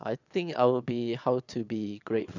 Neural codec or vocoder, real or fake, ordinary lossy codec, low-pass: vocoder, 22.05 kHz, 80 mel bands, Vocos; fake; none; 7.2 kHz